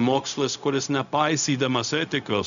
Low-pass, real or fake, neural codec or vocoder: 7.2 kHz; fake; codec, 16 kHz, 0.4 kbps, LongCat-Audio-Codec